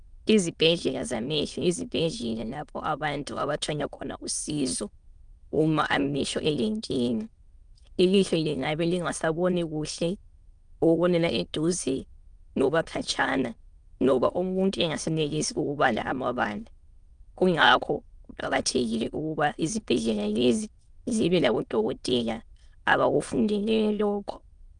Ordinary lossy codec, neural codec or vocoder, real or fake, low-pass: Opus, 32 kbps; autoencoder, 22.05 kHz, a latent of 192 numbers a frame, VITS, trained on many speakers; fake; 9.9 kHz